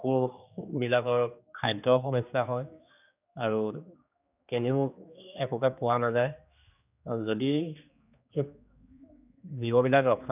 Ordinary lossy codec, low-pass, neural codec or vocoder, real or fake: none; 3.6 kHz; codec, 16 kHz, 2 kbps, X-Codec, HuBERT features, trained on general audio; fake